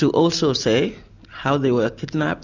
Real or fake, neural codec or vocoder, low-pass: real; none; 7.2 kHz